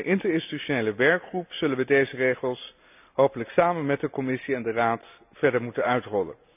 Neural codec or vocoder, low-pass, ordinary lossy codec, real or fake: none; 3.6 kHz; none; real